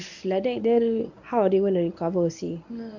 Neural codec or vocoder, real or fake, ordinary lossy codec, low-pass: codec, 24 kHz, 0.9 kbps, WavTokenizer, medium speech release version 1; fake; none; 7.2 kHz